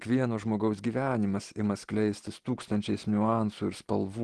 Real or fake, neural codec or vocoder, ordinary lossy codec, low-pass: real; none; Opus, 16 kbps; 10.8 kHz